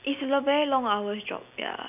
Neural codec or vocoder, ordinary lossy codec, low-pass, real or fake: none; none; 3.6 kHz; real